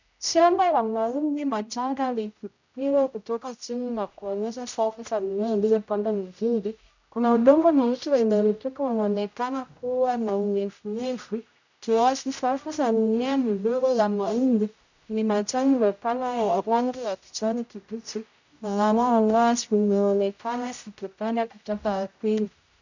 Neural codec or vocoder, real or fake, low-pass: codec, 16 kHz, 0.5 kbps, X-Codec, HuBERT features, trained on general audio; fake; 7.2 kHz